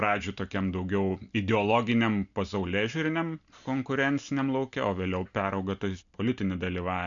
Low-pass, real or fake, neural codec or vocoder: 7.2 kHz; real; none